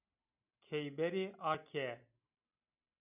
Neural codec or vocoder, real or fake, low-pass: none; real; 3.6 kHz